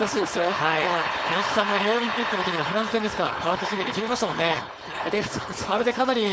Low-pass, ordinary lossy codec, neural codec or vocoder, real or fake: none; none; codec, 16 kHz, 4.8 kbps, FACodec; fake